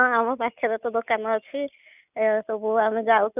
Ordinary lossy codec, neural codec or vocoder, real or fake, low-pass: none; none; real; 3.6 kHz